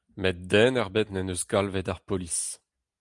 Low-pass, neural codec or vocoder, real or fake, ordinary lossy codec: 10.8 kHz; none; real; Opus, 32 kbps